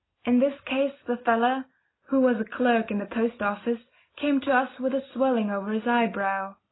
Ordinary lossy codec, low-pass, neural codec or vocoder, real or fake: AAC, 16 kbps; 7.2 kHz; none; real